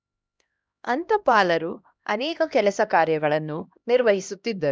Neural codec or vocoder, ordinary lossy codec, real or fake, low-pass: codec, 16 kHz, 1 kbps, X-Codec, HuBERT features, trained on LibriSpeech; none; fake; none